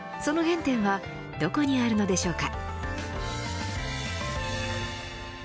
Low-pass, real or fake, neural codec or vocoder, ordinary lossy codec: none; real; none; none